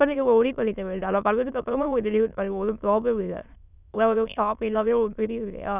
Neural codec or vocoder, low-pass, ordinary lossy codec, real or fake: autoencoder, 22.05 kHz, a latent of 192 numbers a frame, VITS, trained on many speakers; 3.6 kHz; none; fake